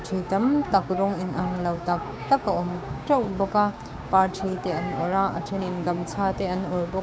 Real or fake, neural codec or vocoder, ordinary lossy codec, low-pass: fake; codec, 16 kHz, 6 kbps, DAC; none; none